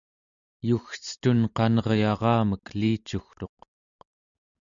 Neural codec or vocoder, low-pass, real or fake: none; 7.2 kHz; real